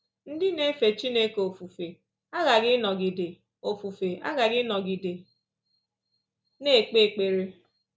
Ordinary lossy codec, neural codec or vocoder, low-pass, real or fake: none; none; none; real